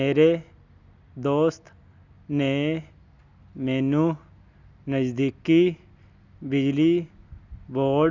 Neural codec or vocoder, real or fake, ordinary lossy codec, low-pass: none; real; none; 7.2 kHz